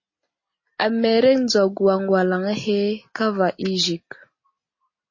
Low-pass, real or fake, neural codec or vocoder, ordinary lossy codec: 7.2 kHz; real; none; MP3, 32 kbps